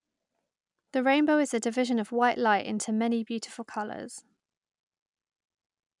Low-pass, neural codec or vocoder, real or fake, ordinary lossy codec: 10.8 kHz; none; real; none